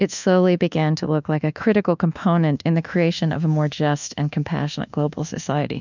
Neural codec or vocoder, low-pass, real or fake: codec, 24 kHz, 1.2 kbps, DualCodec; 7.2 kHz; fake